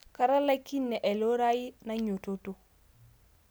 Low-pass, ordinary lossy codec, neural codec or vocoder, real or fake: none; none; none; real